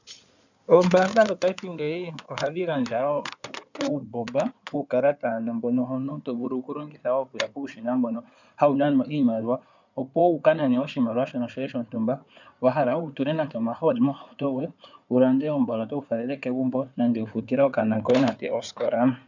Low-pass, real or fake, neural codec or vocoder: 7.2 kHz; fake; codec, 16 kHz in and 24 kHz out, 2.2 kbps, FireRedTTS-2 codec